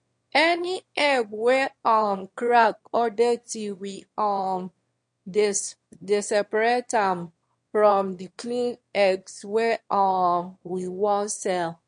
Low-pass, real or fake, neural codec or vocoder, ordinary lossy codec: 9.9 kHz; fake; autoencoder, 22.05 kHz, a latent of 192 numbers a frame, VITS, trained on one speaker; MP3, 48 kbps